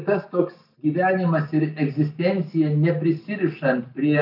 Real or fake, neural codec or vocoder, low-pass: real; none; 5.4 kHz